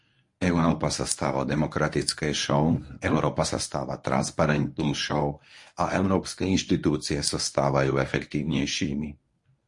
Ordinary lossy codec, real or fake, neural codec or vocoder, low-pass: MP3, 48 kbps; fake; codec, 24 kHz, 0.9 kbps, WavTokenizer, medium speech release version 1; 10.8 kHz